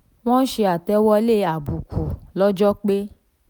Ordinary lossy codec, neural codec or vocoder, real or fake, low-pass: none; none; real; none